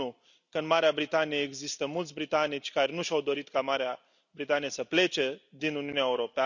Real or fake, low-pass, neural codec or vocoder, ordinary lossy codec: real; 7.2 kHz; none; none